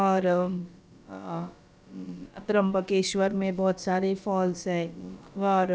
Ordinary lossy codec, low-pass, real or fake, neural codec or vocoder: none; none; fake; codec, 16 kHz, about 1 kbps, DyCAST, with the encoder's durations